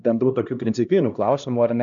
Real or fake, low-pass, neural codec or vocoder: fake; 7.2 kHz; codec, 16 kHz, 1 kbps, X-Codec, HuBERT features, trained on LibriSpeech